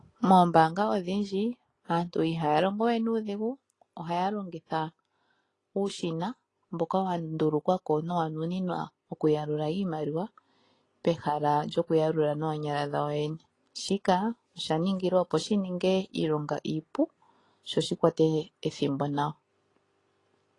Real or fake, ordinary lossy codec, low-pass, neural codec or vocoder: real; AAC, 32 kbps; 10.8 kHz; none